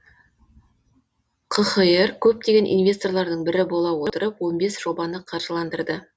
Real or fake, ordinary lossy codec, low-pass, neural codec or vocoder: real; none; none; none